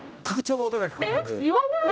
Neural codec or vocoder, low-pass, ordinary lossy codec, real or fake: codec, 16 kHz, 0.5 kbps, X-Codec, HuBERT features, trained on general audio; none; none; fake